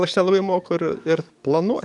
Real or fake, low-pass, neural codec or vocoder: fake; 10.8 kHz; codec, 44.1 kHz, 7.8 kbps, DAC